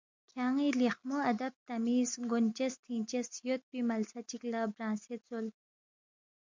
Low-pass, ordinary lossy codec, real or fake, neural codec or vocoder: 7.2 kHz; MP3, 64 kbps; real; none